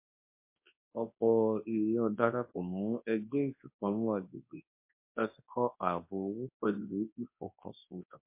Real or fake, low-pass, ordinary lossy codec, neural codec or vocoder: fake; 3.6 kHz; MP3, 24 kbps; codec, 24 kHz, 0.9 kbps, WavTokenizer, large speech release